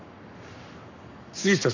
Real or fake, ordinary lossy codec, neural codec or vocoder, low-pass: fake; none; codec, 44.1 kHz, 7.8 kbps, Pupu-Codec; 7.2 kHz